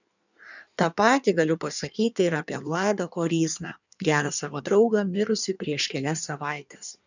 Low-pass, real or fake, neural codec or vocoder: 7.2 kHz; fake; codec, 16 kHz in and 24 kHz out, 1.1 kbps, FireRedTTS-2 codec